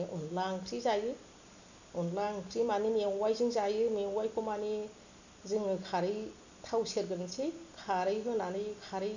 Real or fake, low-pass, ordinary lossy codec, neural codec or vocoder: real; 7.2 kHz; none; none